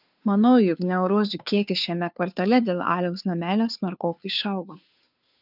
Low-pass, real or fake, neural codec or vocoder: 5.4 kHz; fake; codec, 16 kHz, 2 kbps, FunCodec, trained on Chinese and English, 25 frames a second